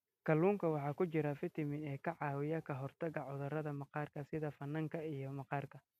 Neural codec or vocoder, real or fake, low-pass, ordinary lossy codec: none; real; 14.4 kHz; none